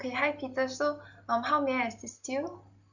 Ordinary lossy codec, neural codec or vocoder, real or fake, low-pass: none; none; real; 7.2 kHz